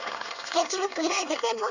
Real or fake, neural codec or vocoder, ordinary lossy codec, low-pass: fake; codec, 24 kHz, 1 kbps, SNAC; none; 7.2 kHz